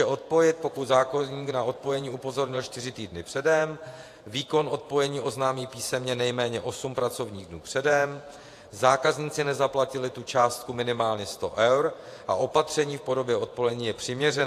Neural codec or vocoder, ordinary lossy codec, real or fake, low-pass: vocoder, 48 kHz, 128 mel bands, Vocos; AAC, 64 kbps; fake; 14.4 kHz